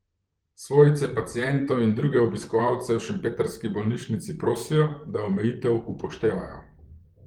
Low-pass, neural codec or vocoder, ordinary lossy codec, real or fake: 19.8 kHz; vocoder, 44.1 kHz, 128 mel bands, Pupu-Vocoder; Opus, 24 kbps; fake